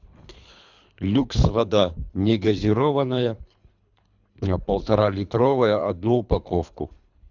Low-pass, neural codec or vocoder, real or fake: 7.2 kHz; codec, 24 kHz, 3 kbps, HILCodec; fake